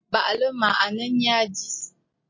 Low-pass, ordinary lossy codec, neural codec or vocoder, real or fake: 7.2 kHz; MP3, 32 kbps; none; real